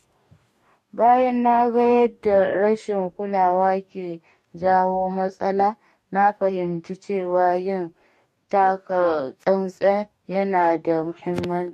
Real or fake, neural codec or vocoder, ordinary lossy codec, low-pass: fake; codec, 44.1 kHz, 2.6 kbps, DAC; MP3, 64 kbps; 14.4 kHz